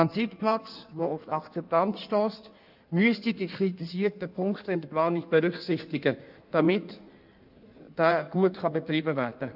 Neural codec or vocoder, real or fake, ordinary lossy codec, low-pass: codec, 16 kHz in and 24 kHz out, 1.1 kbps, FireRedTTS-2 codec; fake; none; 5.4 kHz